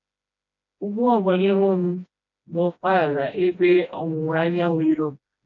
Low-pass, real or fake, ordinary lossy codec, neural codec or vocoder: 7.2 kHz; fake; none; codec, 16 kHz, 1 kbps, FreqCodec, smaller model